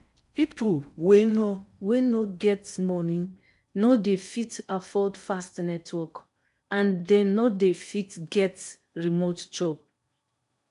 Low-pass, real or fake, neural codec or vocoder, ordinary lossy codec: 10.8 kHz; fake; codec, 16 kHz in and 24 kHz out, 0.6 kbps, FocalCodec, streaming, 4096 codes; MP3, 96 kbps